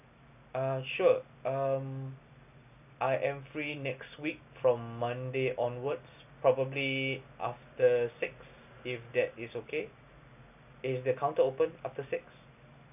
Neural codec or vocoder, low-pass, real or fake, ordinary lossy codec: none; 3.6 kHz; real; none